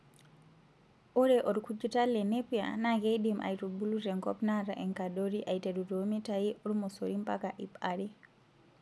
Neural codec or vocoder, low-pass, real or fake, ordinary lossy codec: none; none; real; none